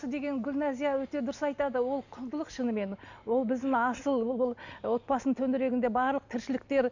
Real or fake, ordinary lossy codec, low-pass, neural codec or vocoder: real; none; 7.2 kHz; none